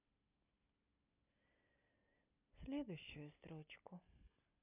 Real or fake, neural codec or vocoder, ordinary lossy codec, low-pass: real; none; none; 3.6 kHz